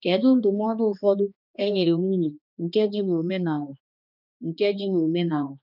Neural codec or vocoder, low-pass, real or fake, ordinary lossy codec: codec, 16 kHz, 2 kbps, X-Codec, HuBERT features, trained on balanced general audio; 5.4 kHz; fake; none